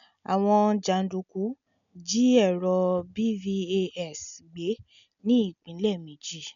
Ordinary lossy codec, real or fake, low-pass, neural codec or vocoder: none; real; 7.2 kHz; none